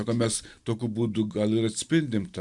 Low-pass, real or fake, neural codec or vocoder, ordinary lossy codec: 10.8 kHz; real; none; Opus, 64 kbps